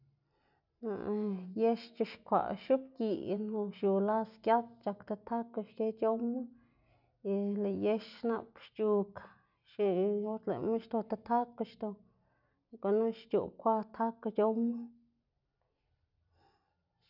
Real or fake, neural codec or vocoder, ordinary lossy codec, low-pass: real; none; none; 5.4 kHz